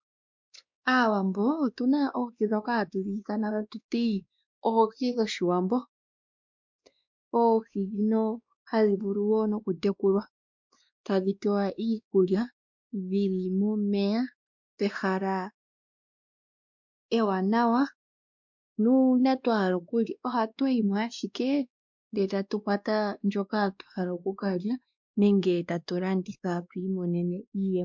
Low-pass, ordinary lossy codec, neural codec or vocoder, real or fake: 7.2 kHz; MP3, 64 kbps; codec, 16 kHz, 2 kbps, X-Codec, WavLM features, trained on Multilingual LibriSpeech; fake